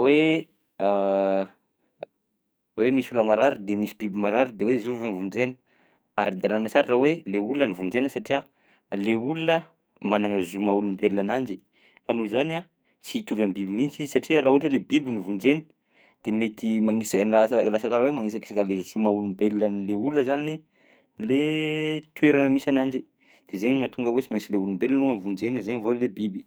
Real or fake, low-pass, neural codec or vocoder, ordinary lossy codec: fake; none; codec, 44.1 kHz, 2.6 kbps, SNAC; none